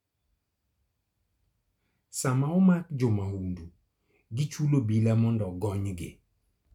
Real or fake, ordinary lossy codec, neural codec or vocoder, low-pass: real; none; none; 19.8 kHz